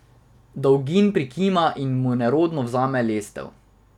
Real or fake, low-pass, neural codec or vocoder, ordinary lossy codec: real; 19.8 kHz; none; none